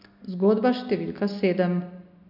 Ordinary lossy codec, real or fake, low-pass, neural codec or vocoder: none; real; 5.4 kHz; none